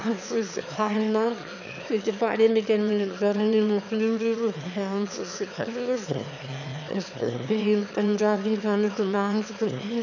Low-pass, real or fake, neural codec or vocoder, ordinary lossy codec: 7.2 kHz; fake; autoencoder, 22.05 kHz, a latent of 192 numbers a frame, VITS, trained on one speaker; none